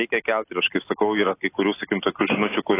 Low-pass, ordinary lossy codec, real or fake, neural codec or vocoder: 3.6 kHz; AAC, 16 kbps; real; none